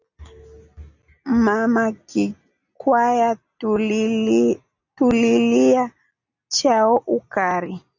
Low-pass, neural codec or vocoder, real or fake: 7.2 kHz; none; real